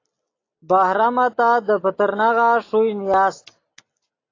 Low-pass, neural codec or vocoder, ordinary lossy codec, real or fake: 7.2 kHz; none; AAC, 32 kbps; real